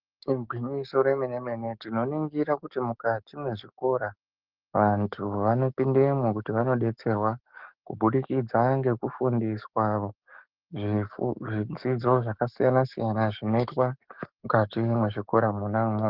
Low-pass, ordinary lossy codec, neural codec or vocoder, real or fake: 5.4 kHz; Opus, 16 kbps; codec, 44.1 kHz, 7.8 kbps, DAC; fake